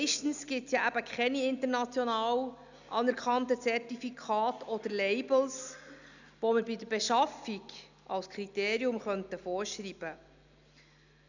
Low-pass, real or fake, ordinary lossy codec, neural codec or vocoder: 7.2 kHz; real; none; none